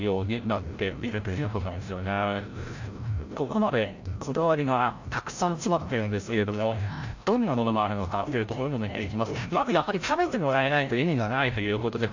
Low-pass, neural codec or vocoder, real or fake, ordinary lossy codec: 7.2 kHz; codec, 16 kHz, 0.5 kbps, FreqCodec, larger model; fake; AAC, 48 kbps